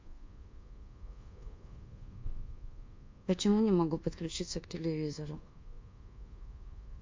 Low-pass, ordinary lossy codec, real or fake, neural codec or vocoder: 7.2 kHz; MP3, 48 kbps; fake; codec, 24 kHz, 1.2 kbps, DualCodec